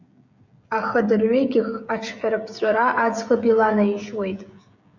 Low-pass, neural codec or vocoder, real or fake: 7.2 kHz; codec, 16 kHz, 16 kbps, FreqCodec, smaller model; fake